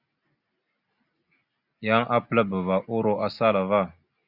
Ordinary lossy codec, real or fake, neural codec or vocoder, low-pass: Opus, 64 kbps; real; none; 5.4 kHz